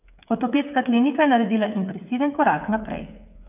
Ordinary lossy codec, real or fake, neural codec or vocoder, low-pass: none; fake; codec, 16 kHz, 8 kbps, FreqCodec, smaller model; 3.6 kHz